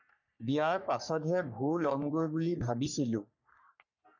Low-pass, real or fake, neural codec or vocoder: 7.2 kHz; fake; codec, 44.1 kHz, 3.4 kbps, Pupu-Codec